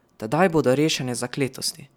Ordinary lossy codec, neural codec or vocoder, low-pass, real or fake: none; none; 19.8 kHz; real